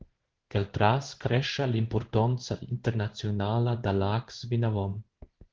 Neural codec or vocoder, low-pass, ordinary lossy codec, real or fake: codec, 16 kHz in and 24 kHz out, 1 kbps, XY-Tokenizer; 7.2 kHz; Opus, 16 kbps; fake